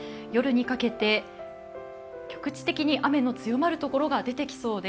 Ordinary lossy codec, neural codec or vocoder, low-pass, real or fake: none; none; none; real